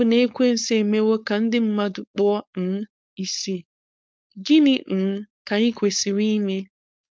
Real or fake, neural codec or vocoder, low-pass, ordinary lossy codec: fake; codec, 16 kHz, 4.8 kbps, FACodec; none; none